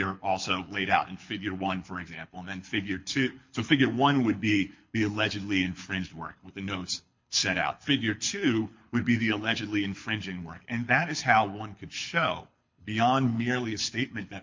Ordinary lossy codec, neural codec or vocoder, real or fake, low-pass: MP3, 48 kbps; codec, 24 kHz, 6 kbps, HILCodec; fake; 7.2 kHz